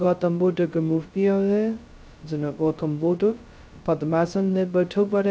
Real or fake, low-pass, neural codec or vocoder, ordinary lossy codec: fake; none; codec, 16 kHz, 0.2 kbps, FocalCodec; none